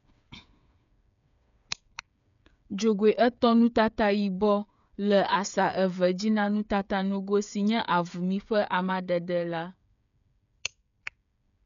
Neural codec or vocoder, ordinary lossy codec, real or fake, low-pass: codec, 16 kHz, 8 kbps, FreqCodec, smaller model; none; fake; 7.2 kHz